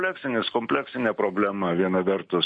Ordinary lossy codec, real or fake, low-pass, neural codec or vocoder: MP3, 48 kbps; real; 9.9 kHz; none